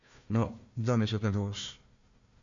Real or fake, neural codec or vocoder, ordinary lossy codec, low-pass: fake; codec, 16 kHz, 1 kbps, FunCodec, trained on Chinese and English, 50 frames a second; AAC, 64 kbps; 7.2 kHz